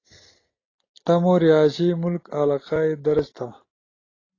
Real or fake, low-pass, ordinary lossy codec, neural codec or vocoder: real; 7.2 kHz; AAC, 32 kbps; none